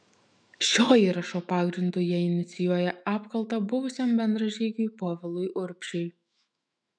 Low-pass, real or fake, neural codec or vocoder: 9.9 kHz; fake; autoencoder, 48 kHz, 128 numbers a frame, DAC-VAE, trained on Japanese speech